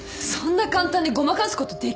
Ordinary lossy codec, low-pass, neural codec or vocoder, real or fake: none; none; none; real